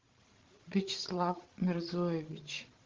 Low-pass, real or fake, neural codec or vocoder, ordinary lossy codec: 7.2 kHz; fake; codec, 16 kHz, 16 kbps, FreqCodec, larger model; Opus, 16 kbps